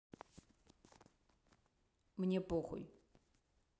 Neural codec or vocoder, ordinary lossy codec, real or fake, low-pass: none; none; real; none